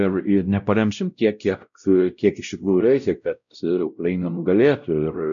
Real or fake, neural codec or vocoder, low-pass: fake; codec, 16 kHz, 0.5 kbps, X-Codec, WavLM features, trained on Multilingual LibriSpeech; 7.2 kHz